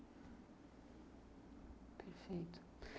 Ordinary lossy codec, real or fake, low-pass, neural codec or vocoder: none; real; none; none